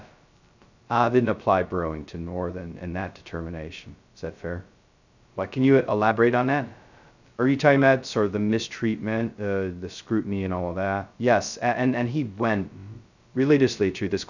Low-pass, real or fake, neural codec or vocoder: 7.2 kHz; fake; codec, 16 kHz, 0.2 kbps, FocalCodec